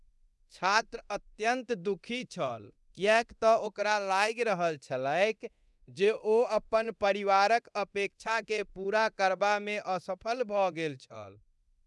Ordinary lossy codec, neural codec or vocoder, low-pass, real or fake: none; codec, 24 kHz, 0.9 kbps, DualCodec; none; fake